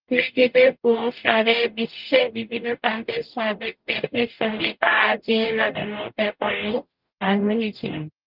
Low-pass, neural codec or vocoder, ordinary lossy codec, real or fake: 5.4 kHz; codec, 44.1 kHz, 0.9 kbps, DAC; Opus, 32 kbps; fake